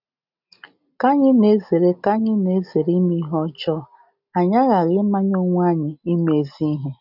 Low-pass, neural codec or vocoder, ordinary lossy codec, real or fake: 5.4 kHz; none; none; real